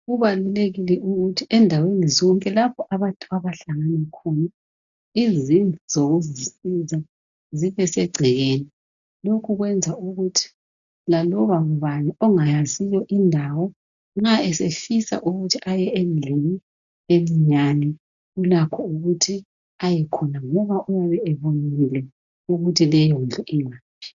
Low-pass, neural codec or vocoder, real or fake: 7.2 kHz; none; real